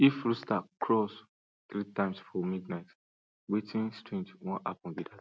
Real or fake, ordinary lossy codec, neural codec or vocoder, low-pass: real; none; none; none